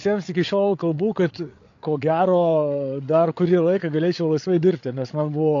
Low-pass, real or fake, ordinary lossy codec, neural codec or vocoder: 7.2 kHz; fake; AAC, 48 kbps; codec, 16 kHz, 8 kbps, FreqCodec, larger model